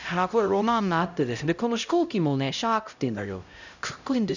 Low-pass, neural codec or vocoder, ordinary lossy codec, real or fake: 7.2 kHz; codec, 16 kHz, 0.5 kbps, X-Codec, HuBERT features, trained on LibriSpeech; none; fake